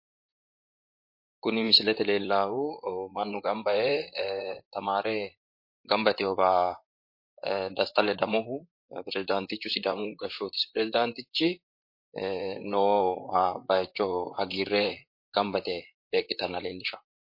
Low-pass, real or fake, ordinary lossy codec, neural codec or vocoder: 5.4 kHz; fake; MP3, 32 kbps; vocoder, 44.1 kHz, 128 mel bands, Pupu-Vocoder